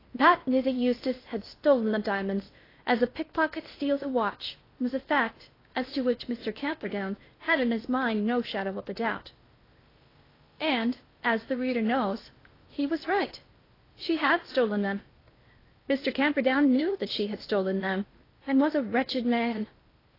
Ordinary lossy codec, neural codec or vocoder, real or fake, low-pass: AAC, 24 kbps; codec, 16 kHz in and 24 kHz out, 0.6 kbps, FocalCodec, streaming, 4096 codes; fake; 5.4 kHz